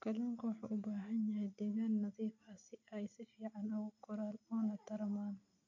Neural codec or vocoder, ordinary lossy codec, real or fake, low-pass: none; AAC, 48 kbps; real; 7.2 kHz